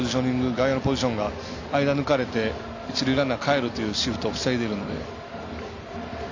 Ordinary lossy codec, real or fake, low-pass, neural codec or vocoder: AAC, 48 kbps; real; 7.2 kHz; none